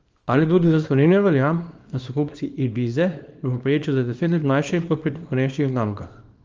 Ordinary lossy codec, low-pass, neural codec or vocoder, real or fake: Opus, 24 kbps; 7.2 kHz; codec, 24 kHz, 0.9 kbps, WavTokenizer, small release; fake